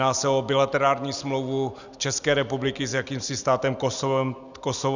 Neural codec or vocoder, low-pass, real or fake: none; 7.2 kHz; real